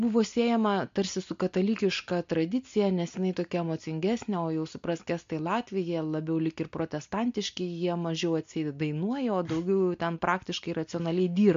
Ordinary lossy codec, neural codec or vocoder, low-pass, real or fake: MP3, 48 kbps; none; 7.2 kHz; real